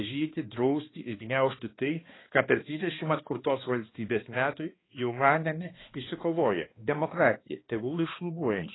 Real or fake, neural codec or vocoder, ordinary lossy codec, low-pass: fake; codec, 16 kHz, 2 kbps, X-Codec, HuBERT features, trained on balanced general audio; AAC, 16 kbps; 7.2 kHz